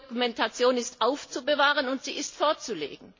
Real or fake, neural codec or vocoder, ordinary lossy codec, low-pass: real; none; MP3, 32 kbps; 7.2 kHz